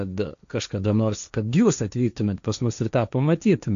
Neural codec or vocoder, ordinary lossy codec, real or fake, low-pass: codec, 16 kHz, 1.1 kbps, Voila-Tokenizer; AAC, 96 kbps; fake; 7.2 kHz